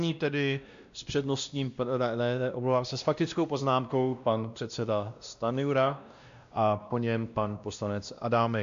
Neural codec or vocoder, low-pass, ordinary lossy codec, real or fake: codec, 16 kHz, 1 kbps, X-Codec, WavLM features, trained on Multilingual LibriSpeech; 7.2 kHz; MP3, 64 kbps; fake